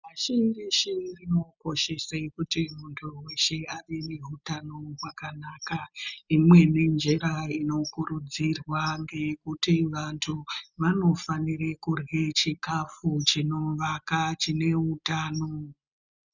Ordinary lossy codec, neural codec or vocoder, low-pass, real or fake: Opus, 64 kbps; none; 7.2 kHz; real